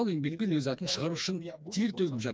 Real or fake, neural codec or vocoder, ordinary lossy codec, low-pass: fake; codec, 16 kHz, 2 kbps, FreqCodec, smaller model; none; none